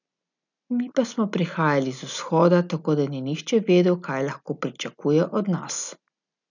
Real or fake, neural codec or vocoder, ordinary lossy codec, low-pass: real; none; none; 7.2 kHz